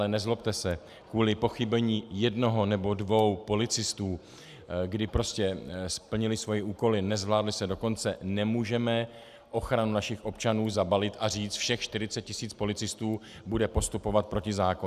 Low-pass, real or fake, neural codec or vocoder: 14.4 kHz; real; none